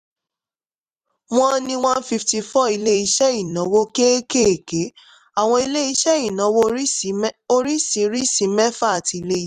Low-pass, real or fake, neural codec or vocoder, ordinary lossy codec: 14.4 kHz; fake; vocoder, 44.1 kHz, 128 mel bands every 256 samples, BigVGAN v2; none